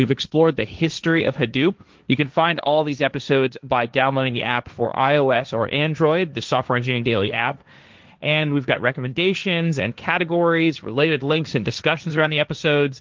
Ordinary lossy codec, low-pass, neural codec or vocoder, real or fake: Opus, 32 kbps; 7.2 kHz; codec, 16 kHz, 1.1 kbps, Voila-Tokenizer; fake